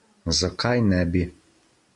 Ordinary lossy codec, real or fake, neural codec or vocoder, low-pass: MP3, 64 kbps; real; none; 10.8 kHz